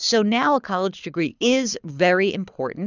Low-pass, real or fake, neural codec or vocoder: 7.2 kHz; fake; codec, 24 kHz, 6 kbps, HILCodec